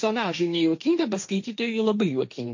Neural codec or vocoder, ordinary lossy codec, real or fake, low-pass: codec, 16 kHz, 1.1 kbps, Voila-Tokenizer; MP3, 48 kbps; fake; 7.2 kHz